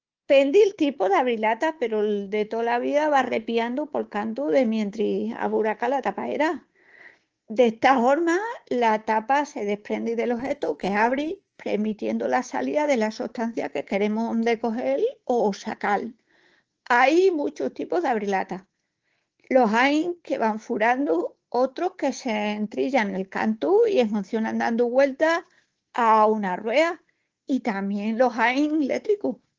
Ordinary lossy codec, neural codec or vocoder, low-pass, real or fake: Opus, 16 kbps; codec, 24 kHz, 3.1 kbps, DualCodec; 7.2 kHz; fake